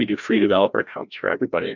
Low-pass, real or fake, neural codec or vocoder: 7.2 kHz; fake; codec, 16 kHz, 1 kbps, FreqCodec, larger model